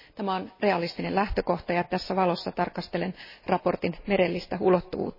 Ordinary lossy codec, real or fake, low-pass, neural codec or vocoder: MP3, 24 kbps; real; 5.4 kHz; none